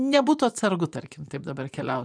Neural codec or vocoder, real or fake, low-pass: vocoder, 24 kHz, 100 mel bands, Vocos; fake; 9.9 kHz